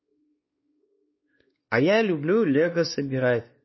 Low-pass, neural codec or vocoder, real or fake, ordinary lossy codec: 7.2 kHz; codec, 16 kHz, 2 kbps, X-Codec, WavLM features, trained on Multilingual LibriSpeech; fake; MP3, 24 kbps